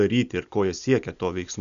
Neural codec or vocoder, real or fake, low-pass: none; real; 7.2 kHz